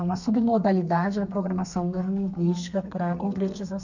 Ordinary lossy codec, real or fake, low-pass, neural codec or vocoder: none; fake; 7.2 kHz; codec, 32 kHz, 1.9 kbps, SNAC